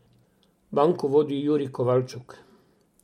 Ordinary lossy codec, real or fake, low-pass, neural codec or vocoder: MP3, 64 kbps; real; 19.8 kHz; none